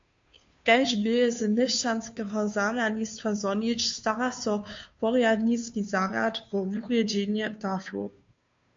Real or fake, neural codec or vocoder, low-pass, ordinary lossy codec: fake; codec, 16 kHz, 2 kbps, FunCodec, trained on Chinese and English, 25 frames a second; 7.2 kHz; MP3, 48 kbps